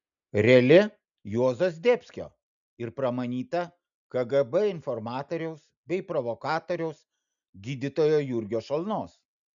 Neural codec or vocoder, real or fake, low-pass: none; real; 7.2 kHz